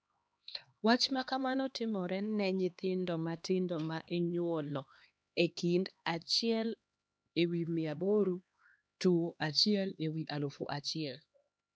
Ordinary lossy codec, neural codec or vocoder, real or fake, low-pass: none; codec, 16 kHz, 2 kbps, X-Codec, HuBERT features, trained on LibriSpeech; fake; none